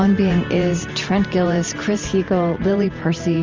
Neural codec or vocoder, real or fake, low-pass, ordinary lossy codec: vocoder, 44.1 kHz, 128 mel bands every 512 samples, BigVGAN v2; fake; 7.2 kHz; Opus, 32 kbps